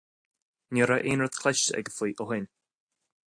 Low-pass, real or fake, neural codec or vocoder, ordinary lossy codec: 9.9 kHz; real; none; AAC, 64 kbps